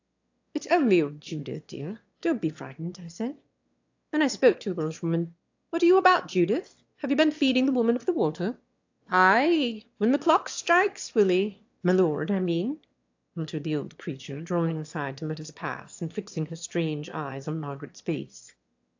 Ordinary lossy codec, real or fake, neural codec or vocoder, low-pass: AAC, 48 kbps; fake; autoencoder, 22.05 kHz, a latent of 192 numbers a frame, VITS, trained on one speaker; 7.2 kHz